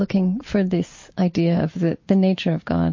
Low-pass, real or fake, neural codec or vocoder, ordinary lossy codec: 7.2 kHz; real; none; MP3, 32 kbps